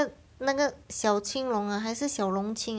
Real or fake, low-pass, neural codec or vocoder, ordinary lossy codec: real; none; none; none